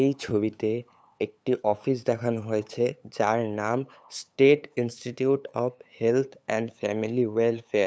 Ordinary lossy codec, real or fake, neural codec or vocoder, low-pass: none; fake; codec, 16 kHz, 8 kbps, FunCodec, trained on LibriTTS, 25 frames a second; none